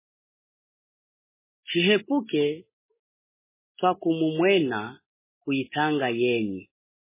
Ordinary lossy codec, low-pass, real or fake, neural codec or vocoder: MP3, 16 kbps; 3.6 kHz; real; none